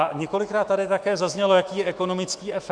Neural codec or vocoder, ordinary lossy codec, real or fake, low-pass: autoencoder, 48 kHz, 128 numbers a frame, DAC-VAE, trained on Japanese speech; Opus, 64 kbps; fake; 9.9 kHz